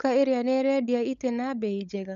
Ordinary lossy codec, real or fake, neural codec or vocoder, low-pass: none; fake; codec, 16 kHz, 16 kbps, FunCodec, trained on LibriTTS, 50 frames a second; 7.2 kHz